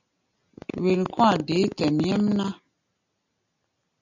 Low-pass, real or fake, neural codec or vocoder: 7.2 kHz; real; none